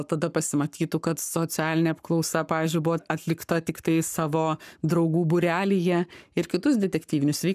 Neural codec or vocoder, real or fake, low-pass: codec, 44.1 kHz, 7.8 kbps, Pupu-Codec; fake; 14.4 kHz